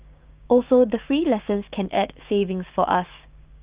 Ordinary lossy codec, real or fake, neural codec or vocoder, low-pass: Opus, 32 kbps; fake; codec, 16 kHz, 6 kbps, DAC; 3.6 kHz